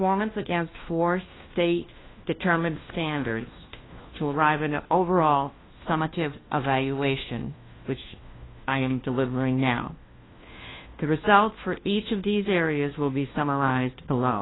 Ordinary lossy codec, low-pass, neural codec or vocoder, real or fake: AAC, 16 kbps; 7.2 kHz; codec, 16 kHz, 1 kbps, FunCodec, trained on LibriTTS, 50 frames a second; fake